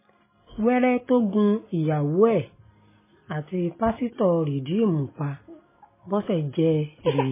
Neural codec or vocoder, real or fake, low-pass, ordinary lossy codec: none; real; 3.6 kHz; MP3, 16 kbps